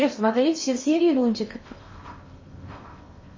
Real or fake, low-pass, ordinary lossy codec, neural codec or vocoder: fake; 7.2 kHz; MP3, 32 kbps; codec, 16 kHz in and 24 kHz out, 0.8 kbps, FocalCodec, streaming, 65536 codes